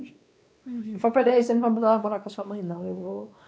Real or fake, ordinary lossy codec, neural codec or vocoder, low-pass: fake; none; codec, 16 kHz, 2 kbps, X-Codec, WavLM features, trained on Multilingual LibriSpeech; none